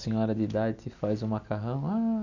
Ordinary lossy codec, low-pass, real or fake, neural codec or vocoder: none; 7.2 kHz; real; none